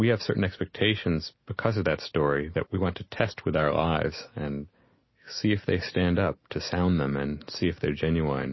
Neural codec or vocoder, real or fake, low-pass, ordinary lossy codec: none; real; 7.2 kHz; MP3, 24 kbps